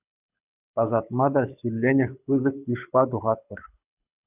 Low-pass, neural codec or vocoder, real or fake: 3.6 kHz; codec, 24 kHz, 6 kbps, HILCodec; fake